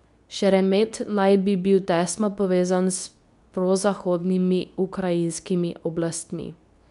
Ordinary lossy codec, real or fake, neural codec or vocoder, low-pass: none; fake; codec, 24 kHz, 0.9 kbps, WavTokenizer, medium speech release version 2; 10.8 kHz